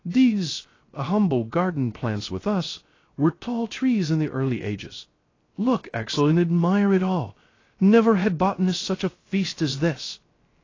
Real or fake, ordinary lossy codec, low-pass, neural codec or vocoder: fake; AAC, 32 kbps; 7.2 kHz; codec, 16 kHz, 0.3 kbps, FocalCodec